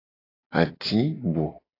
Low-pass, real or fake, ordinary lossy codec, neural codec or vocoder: 5.4 kHz; real; AAC, 24 kbps; none